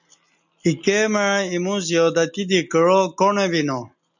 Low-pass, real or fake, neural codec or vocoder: 7.2 kHz; real; none